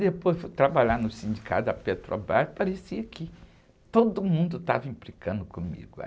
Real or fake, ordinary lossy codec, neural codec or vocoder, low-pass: real; none; none; none